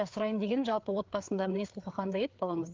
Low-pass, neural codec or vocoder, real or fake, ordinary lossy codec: 7.2 kHz; codec, 16 kHz, 4 kbps, FreqCodec, larger model; fake; Opus, 32 kbps